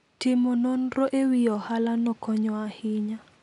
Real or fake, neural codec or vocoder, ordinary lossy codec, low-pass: real; none; none; 10.8 kHz